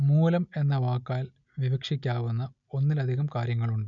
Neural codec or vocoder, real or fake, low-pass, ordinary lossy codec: none; real; 7.2 kHz; none